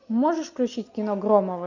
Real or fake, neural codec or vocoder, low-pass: real; none; 7.2 kHz